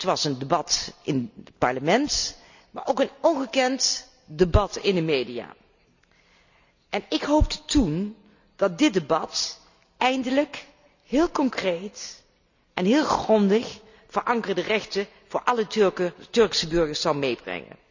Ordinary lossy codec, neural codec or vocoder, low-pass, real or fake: none; none; 7.2 kHz; real